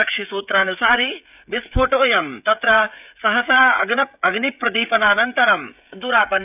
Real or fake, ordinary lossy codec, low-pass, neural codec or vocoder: fake; none; 3.6 kHz; codec, 16 kHz, 16 kbps, FreqCodec, smaller model